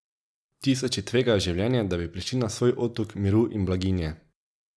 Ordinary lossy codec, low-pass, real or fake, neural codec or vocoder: none; none; real; none